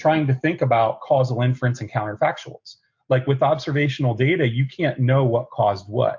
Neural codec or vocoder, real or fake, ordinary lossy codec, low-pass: none; real; MP3, 48 kbps; 7.2 kHz